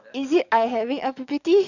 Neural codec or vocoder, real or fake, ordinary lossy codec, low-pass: codec, 44.1 kHz, 7.8 kbps, DAC; fake; none; 7.2 kHz